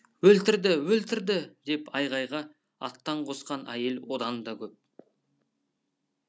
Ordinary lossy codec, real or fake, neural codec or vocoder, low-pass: none; real; none; none